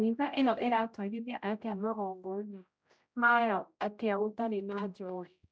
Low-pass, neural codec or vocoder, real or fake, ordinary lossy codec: none; codec, 16 kHz, 0.5 kbps, X-Codec, HuBERT features, trained on general audio; fake; none